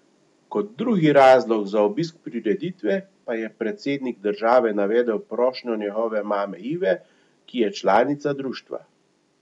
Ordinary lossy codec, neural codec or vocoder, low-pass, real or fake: none; none; 10.8 kHz; real